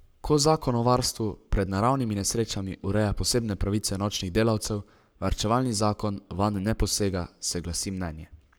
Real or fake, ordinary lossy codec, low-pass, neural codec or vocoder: fake; none; none; codec, 44.1 kHz, 7.8 kbps, Pupu-Codec